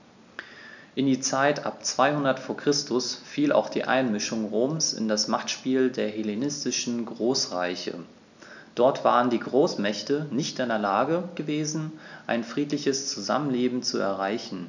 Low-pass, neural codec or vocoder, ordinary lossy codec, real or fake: 7.2 kHz; none; none; real